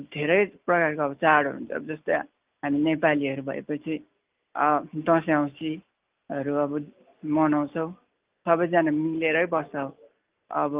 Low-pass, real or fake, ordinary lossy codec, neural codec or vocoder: 3.6 kHz; real; Opus, 32 kbps; none